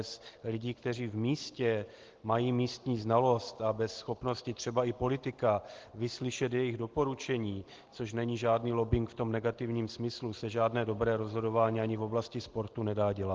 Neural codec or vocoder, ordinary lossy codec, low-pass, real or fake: none; Opus, 16 kbps; 7.2 kHz; real